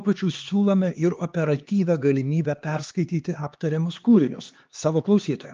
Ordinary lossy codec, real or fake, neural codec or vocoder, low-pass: Opus, 24 kbps; fake; codec, 16 kHz, 2 kbps, X-Codec, HuBERT features, trained on LibriSpeech; 7.2 kHz